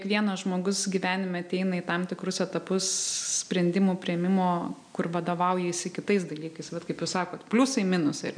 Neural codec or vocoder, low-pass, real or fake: none; 9.9 kHz; real